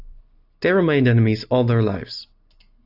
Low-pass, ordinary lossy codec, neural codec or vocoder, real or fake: 5.4 kHz; AAC, 48 kbps; none; real